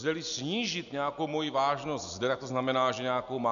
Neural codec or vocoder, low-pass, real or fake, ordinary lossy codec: none; 7.2 kHz; real; MP3, 96 kbps